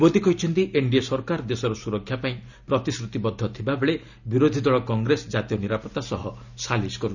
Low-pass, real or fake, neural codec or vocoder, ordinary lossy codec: 7.2 kHz; real; none; none